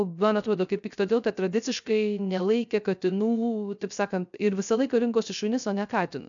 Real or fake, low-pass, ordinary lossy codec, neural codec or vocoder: fake; 7.2 kHz; MP3, 96 kbps; codec, 16 kHz, 0.3 kbps, FocalCodec